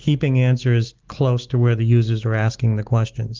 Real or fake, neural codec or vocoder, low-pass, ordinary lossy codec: real; none; 7.2 kHz; Opus, 16 kbps